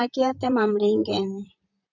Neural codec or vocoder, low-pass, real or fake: vocoder, 44.1 kHz, 128 mel bands, Pupu-Vocoder; 7.2 kHz; fake